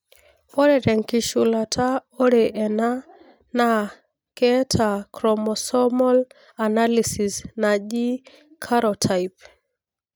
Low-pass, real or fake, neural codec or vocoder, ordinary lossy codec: none; real; none; none